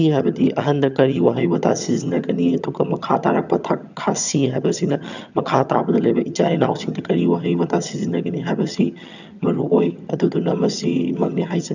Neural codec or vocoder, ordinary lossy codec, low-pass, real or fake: vocoder, 22.05 kHz, 80 mel bands, HiFi-GAN; none; 7.2 kHz; fake